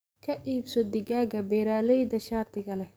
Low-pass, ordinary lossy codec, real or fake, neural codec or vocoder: none; none; fake; codec, 44.1 kHz, 7.8 kbps, DAC